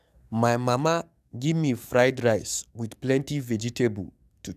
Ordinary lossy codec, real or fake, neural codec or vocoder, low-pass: none; fake; codec, 44.1 kHz, 7.8 kbps, DAC; 14.4 kHz